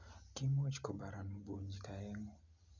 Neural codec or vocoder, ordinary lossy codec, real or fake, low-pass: none; none; real; 7.2 kHz